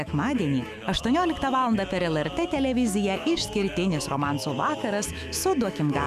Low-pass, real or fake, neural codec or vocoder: 14.4 kHz; fake; autoencoder, 48 kHz, 128 numbers a frame, DAC-VAE, trained on Japanese speech